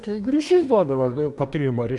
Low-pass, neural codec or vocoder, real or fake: 10.8 kHz; codec, 24 kHz, 1 kbps, SNAC; fake